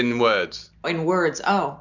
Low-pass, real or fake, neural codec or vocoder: 7.2 kHz; real; none